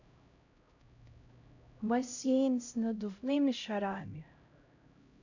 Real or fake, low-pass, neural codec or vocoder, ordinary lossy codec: fake; 7.2 kHz; codec, 16 kHz, 0.5 kbps, X-Codec, HuBERT features, trained on LibriSpeech; none